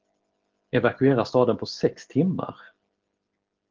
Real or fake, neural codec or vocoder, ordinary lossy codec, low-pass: real; none; Opus, 16 kbps; 7.2 kHz